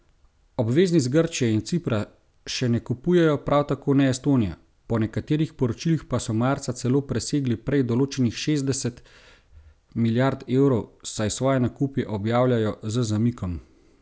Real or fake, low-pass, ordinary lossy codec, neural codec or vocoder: real; none; none; none